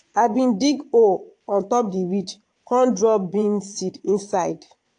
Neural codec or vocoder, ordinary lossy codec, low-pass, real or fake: vocoder, 22.05 kHz, 80 mel bands, Vocos; AAC, 48 kbps; 9.9 kHz; fake